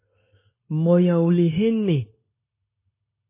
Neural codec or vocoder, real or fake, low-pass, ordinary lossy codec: codec, 16 kHz, 0.9 kbps, LongCat-Audio-Codec; fake; 3.6 kHz; MP3, 16 kbps